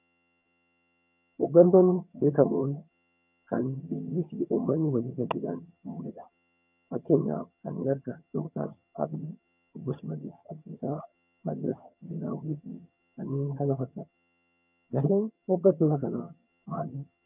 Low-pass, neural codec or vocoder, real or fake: 3.6 kHz; vocoder, 22.05 kHz, 80 mel bands, HiFi-GAN; fake